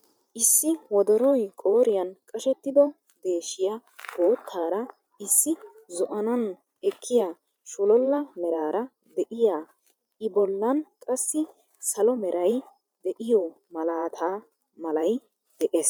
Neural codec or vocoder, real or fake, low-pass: vocoder, 44.1 kHz, 128 mel bands every 512 samples, BigVGAN v2; fake; 19.8 kHz